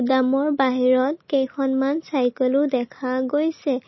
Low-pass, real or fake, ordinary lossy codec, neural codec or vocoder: 7.2 kHz; real; MP3, 24 kbps; none